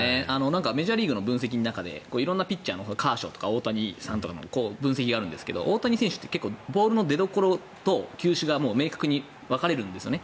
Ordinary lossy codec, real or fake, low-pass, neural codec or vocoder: none; real; none; none